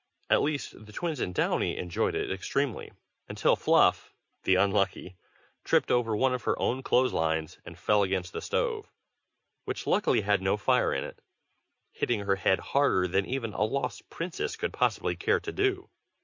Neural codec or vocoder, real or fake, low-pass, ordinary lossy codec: none; real; 7.2 kHz; MP3, 48 kbps